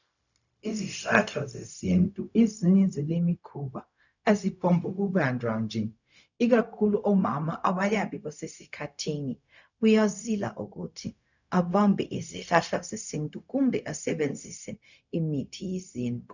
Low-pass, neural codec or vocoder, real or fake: 7.2 kHz; codec, 16 kHz, 0.4 kbps, LongCat-Audio-Codec; fake